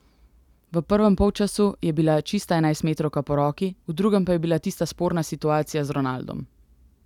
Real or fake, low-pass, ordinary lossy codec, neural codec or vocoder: real; 19.8 kHz; none; none